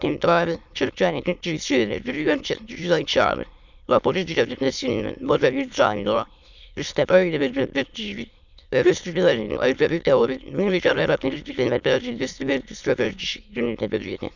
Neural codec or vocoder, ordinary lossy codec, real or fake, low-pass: autoencoder, 22.05 kHz, a latent of 192 numbers a frame, VITS, trained on many speakers; none; fake; 7.2 kHz